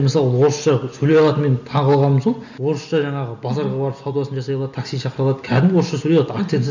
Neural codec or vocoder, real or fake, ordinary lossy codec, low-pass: none; real; none; 7.2 kHz